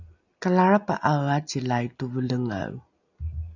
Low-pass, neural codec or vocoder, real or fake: 7.2 kHz; none; real